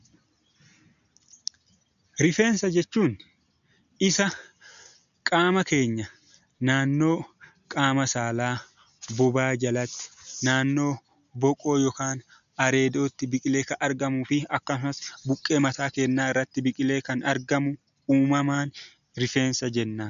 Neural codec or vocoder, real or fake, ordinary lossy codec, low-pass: none; real; MP3, 96 kbps; 7.2 kHz